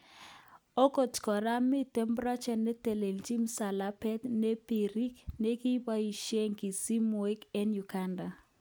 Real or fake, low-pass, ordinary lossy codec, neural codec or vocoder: real; none; none; none